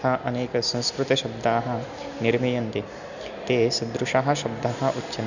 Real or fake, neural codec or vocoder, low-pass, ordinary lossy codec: real; none; 7.2 kHz; none